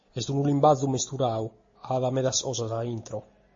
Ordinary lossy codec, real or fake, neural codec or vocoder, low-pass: MP3, 32 kbps; real; none; 7.2 kHz